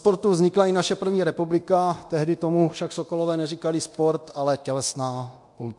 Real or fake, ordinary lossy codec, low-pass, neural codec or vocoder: fake; MP3, 64 kbps; 10.8 kHz; codec, 24 kHz, 0.9 kbps, DualCodec